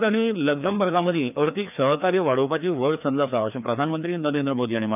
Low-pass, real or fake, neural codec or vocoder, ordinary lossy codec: 3.6 kHz; fake; codec, 16 kHz, 2 kbps, FreqCodec, larger model; none